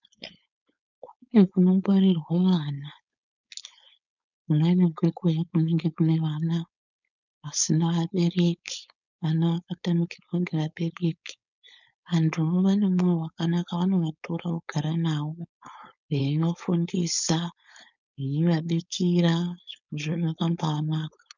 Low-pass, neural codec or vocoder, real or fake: 7.2 kHz; codec, 16 kHz, 4.8 kbps, FACodec; fake